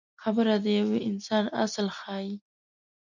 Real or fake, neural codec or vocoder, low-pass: real; none; 7.2 kHz